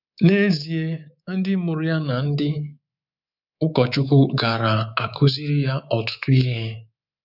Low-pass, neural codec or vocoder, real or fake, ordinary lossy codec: 5.4 kHz; codec, 24 kHz, 3.1 kbps, DualCodec; fake; none